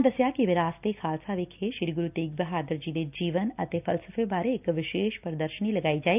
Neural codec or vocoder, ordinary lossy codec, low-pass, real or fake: none; none; 3.6 kHz; real